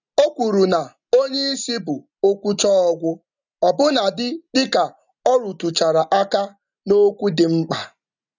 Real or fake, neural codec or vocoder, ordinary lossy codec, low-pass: real; none; none; 7.2 kHz